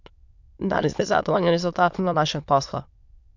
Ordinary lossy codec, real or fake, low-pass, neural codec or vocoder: MP3, 64 kbps; fake; 7.2 kHz; autoencoder, 22.05 kHz, a latent of 192 numbers a frame, VITS, trained on many speakers